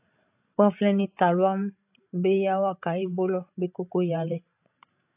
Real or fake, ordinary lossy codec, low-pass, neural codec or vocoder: fake; AAC, 32 kbps; 3.6 kHz; codec, 16 kHz, 16 kbps, FreqCodec, larger model